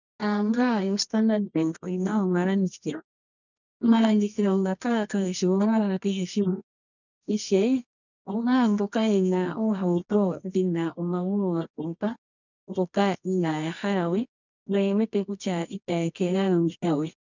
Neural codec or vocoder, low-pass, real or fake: codec, 24 kHz, 0.9 kbps, WavTokenizer, medium music audio release; 7.2 kHz; fake